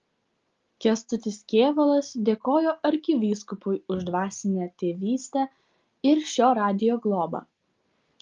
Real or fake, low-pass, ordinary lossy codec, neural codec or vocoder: real; 7.2 kHz; Opus, 32 kbps; none